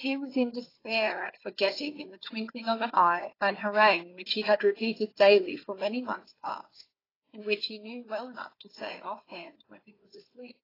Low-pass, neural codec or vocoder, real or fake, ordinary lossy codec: 5.4 kHz; codec, 16 kHz, 4 kbps, FunCodec, trained on Chinese and English, 50 frames a second; fake; AAC, 24 kbps